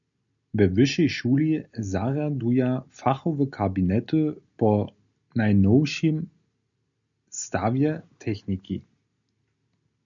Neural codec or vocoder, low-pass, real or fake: none; 7.2 kHz; real